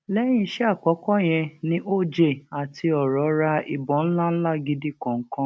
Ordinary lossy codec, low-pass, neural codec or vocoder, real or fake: none; none; none; real